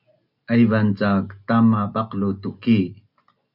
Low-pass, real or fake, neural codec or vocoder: 5.4 kHz; real; none